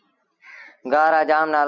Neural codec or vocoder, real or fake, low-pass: none; real; 7.2 kHz